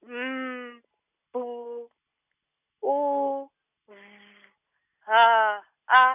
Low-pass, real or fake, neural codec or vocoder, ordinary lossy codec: 3.6 kHz; real; none; none